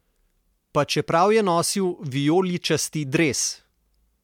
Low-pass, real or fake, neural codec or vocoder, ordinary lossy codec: 19.8 kHz; real; none; MP3, 96 kbps